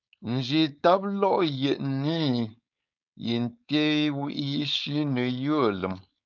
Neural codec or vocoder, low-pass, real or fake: codec, 16 kHz, 4.8 kbps, FACodec; 7.2 kHz; fake